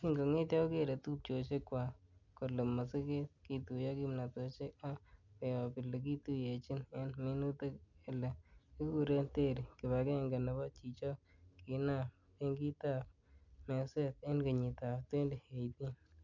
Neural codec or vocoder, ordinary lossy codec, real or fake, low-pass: none; none; real; 7.2 kHz